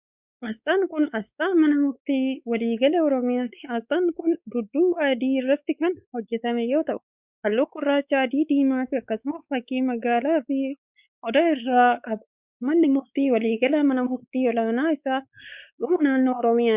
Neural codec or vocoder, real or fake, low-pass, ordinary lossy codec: codec, 16 kHz, 4 kbps, X-Codec, WavLM features, trained on Multilingual LibriSpeech; fake; 3.6 kHz; Opus, 64 kbps